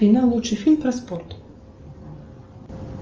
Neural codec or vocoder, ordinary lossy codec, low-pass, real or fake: none; Opus, 24 kbps; 7.2 kHz; real